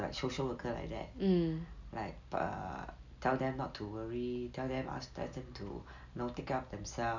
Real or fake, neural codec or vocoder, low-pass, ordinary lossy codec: real; none; 7.2 kHz; none